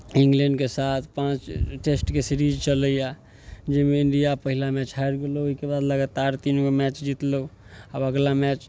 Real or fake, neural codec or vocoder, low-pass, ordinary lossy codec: real; none; none; none